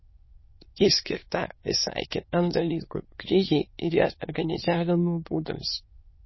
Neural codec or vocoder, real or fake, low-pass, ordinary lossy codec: autoencoder, 22.05 kHz, a latent of 192 numbers a frame, VITS, trained on many speakers; fake; 7.2 kHz; MP3, 24 kbps